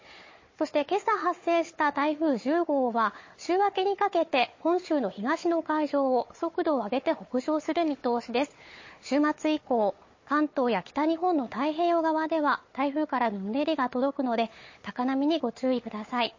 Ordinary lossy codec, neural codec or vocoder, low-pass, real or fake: MP3, 32 kbps; codec, 16 kHz, 4 kbps, FunCodec, trained on Chinese and English, 50 frames a second; 7.2 kHz; fake